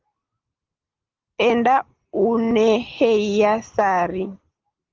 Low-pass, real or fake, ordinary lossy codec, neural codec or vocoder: 7.2 kHz; real; Opus, 16 kbps; none